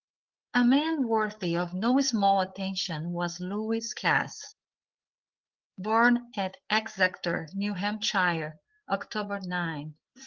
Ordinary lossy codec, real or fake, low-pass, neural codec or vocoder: Opus, 16 kbps; fake; 7.2 kHz; codec, 16 kHz, 8 kbps, FreqCodec, larger model